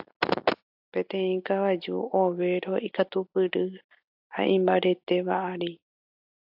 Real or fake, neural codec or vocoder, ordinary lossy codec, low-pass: real; none; AAC, 48 kbps; 5.4 kHz